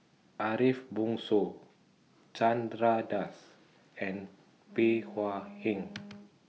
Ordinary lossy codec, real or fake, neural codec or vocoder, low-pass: none; real; none; none